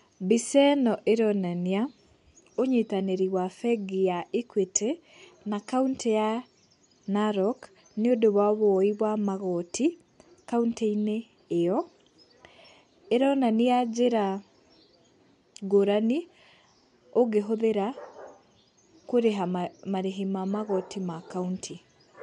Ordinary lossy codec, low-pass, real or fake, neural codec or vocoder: MP3, 64 kbps; 10.8 kHz; real; none